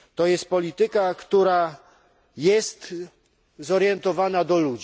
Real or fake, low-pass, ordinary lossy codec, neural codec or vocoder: real; none; none; none